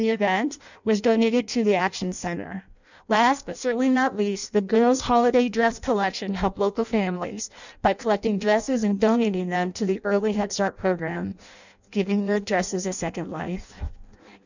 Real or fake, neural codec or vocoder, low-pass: fake; codec, 16 kHz in and 24 kHz out, 0.6 kbps, FireRedTTS-2 codec; 7.2 kHz